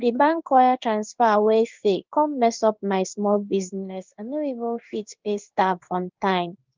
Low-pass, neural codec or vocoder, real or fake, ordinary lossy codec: 7.2 kHz; codec, 16 kHz in and 24 kHz out, 1 kbps, XY-Tokenizer; fake; Opus, 32 kbps